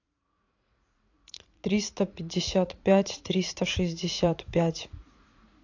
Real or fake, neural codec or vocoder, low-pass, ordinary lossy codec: fake; vocoder, 44.1 kHz, 80 mel bands, Vocos; 7.2 kHz; AAC, 48 kbps